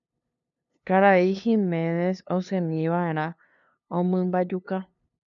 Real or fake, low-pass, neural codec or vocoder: fake; 7.2 kHz; codec, 16 kHz, 2 kbps, FunCodec, trained on LibriTTS, 25 frames a second